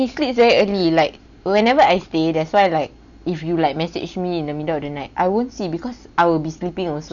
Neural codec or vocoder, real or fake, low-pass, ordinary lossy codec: none; real; 7.2 kHz; none